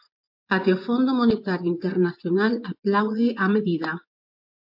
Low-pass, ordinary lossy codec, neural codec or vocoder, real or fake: 5.4 kHz; MP3, 48 kbps; vocoder, 24 kHz, 100 mel bands, Vocos; fake